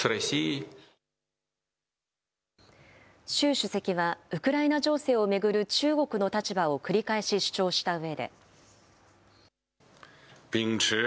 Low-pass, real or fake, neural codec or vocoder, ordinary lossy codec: none; real; none; none